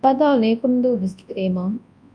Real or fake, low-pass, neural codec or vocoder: fake; 9.9 kHz; codec, 24 kHz, 0.9 kbps, WavTokenizer, large speech release